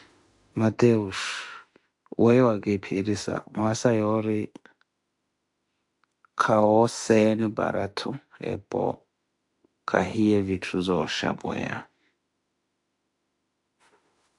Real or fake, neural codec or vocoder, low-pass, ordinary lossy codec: fake; autoencoder, 48 kHz, 32 numbers a frame, DAC-VAE, trained on Japanese speech; 10.8 kHz; AAC, 64 kbps